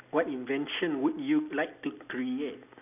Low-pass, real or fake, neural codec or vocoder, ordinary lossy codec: 3.6 kHz; real; none; none